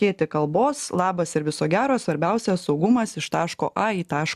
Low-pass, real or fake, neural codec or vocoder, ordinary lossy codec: 14.4 kHz; real; none; MP3, 96 kbps